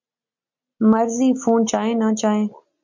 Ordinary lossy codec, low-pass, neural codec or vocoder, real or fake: MP3, 48 kbps; 7.2 kHz; none; real